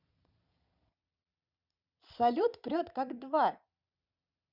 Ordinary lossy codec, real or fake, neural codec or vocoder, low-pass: Opus, 64 kbps; fake; vocoder, 22.05 kHz, 80 mel bands, Vocos; 5.4 kHz